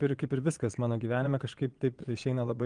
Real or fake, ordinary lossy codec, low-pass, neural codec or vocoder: fake; Opus, 24 kbps; 9.9 kHz; vocoder, 22.05 kHz, 80 mel bands, Vocos